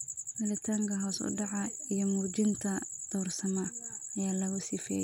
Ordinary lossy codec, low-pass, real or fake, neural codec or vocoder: none; 19.8 kHz; real; none